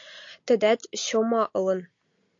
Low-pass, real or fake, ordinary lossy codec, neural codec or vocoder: 7.2 kHz; real; AAC, 48 kbps; none